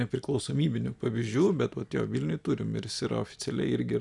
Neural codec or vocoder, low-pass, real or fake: none; 10.8 kHz; real